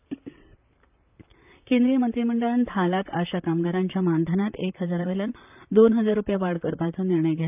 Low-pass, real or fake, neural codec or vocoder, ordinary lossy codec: 3.6 kHz; fake; vocoder, 44.1 kHz, 128 mel bands, Pupu-Vocoder; none